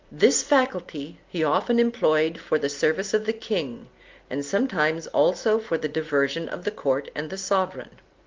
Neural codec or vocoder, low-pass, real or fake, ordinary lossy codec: none; 7.2 kHz; real; Opus, 32 kbps